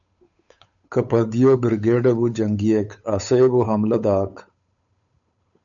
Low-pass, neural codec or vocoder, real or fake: 7.2 kHz; codec, 16 kHz, 8 kbps, FunCodec, trained on Chinese and English, 25 frames a second; fake